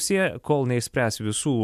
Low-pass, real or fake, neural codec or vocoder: 14.4 kHz; real; none